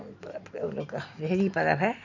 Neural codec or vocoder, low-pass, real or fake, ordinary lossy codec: none; 7.2 kHz; real; none